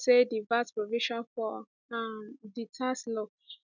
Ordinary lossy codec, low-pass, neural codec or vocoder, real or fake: none; 7.2 kHz; none; real